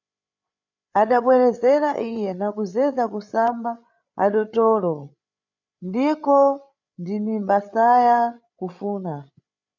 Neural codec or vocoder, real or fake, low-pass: codec, 16 kHz, 8 kbps, FreqCodec, larger model; fake; 7.2 kHz